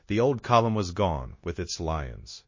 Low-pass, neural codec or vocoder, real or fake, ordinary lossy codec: 7.2 kHz; codec, 16 kHz, 0.9 kbps, LongCat-Audio-Codec; fake; MP3, 32 kbps